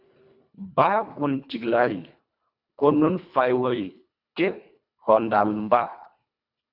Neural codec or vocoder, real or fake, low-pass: codec, 24 kHz, 1.5 kbps, HILCodec; fake; 5.4 kHz